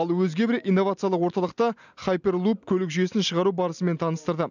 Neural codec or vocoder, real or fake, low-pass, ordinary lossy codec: none; real; 7.2 kHz; none